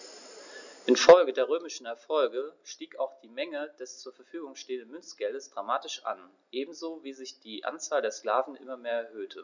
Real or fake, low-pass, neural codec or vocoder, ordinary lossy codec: real; 7.2 kHz; none; none